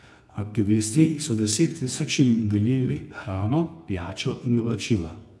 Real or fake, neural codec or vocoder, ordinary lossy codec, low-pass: fake; codec, 24 kHz, 0.9 kbps, WavTokenizer, medium music audio release; none; none